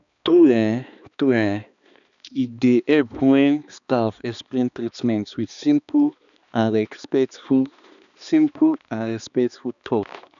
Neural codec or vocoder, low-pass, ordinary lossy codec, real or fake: codec, 16 kHz, 2 kbps, X-Codec, HuBERT features, trained on balanced general audio; 7.2 kHz; none; fake